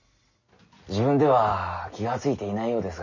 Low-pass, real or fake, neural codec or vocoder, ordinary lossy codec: 7.2 kHz; real; none; none